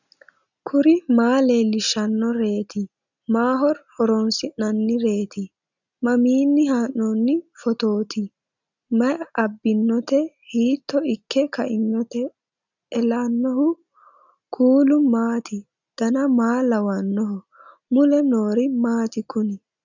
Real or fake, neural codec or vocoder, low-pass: real; none; 7.2 kHz